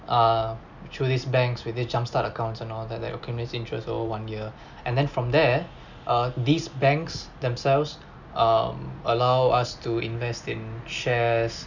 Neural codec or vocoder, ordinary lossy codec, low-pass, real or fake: none; none; 7.2 kHz; real